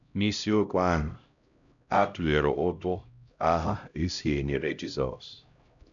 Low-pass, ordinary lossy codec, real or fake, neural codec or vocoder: 7.2 kHz; none; fake; codec, 16 kHz, 0.5 kbps, X-Codec, HuBERT features, trained on LibriSpeech